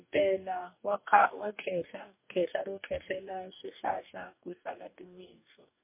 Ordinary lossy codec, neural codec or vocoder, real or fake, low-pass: MP3, 24 kbps; codec, 44.1 kHz, 2.6 kbps, DAC; fake; 3.6 kHz